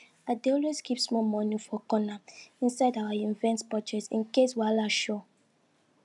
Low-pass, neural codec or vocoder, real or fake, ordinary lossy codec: 10.8 kHz; none; real; none